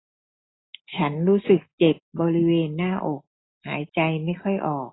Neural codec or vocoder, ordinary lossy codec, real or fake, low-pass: none; AAC, 16 kbps; real; 7.2 kHz